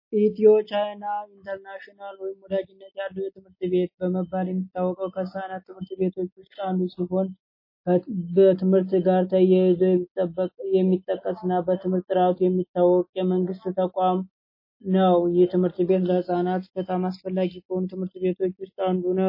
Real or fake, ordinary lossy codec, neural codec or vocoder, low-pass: real; MP3, 24 kbps; none; 5.4 kHz